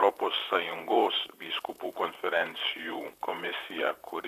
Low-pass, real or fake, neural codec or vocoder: 14.4 kHz; fake; vocoder, 44.1 kHz, 128 mel bands, Pupu-Vocoder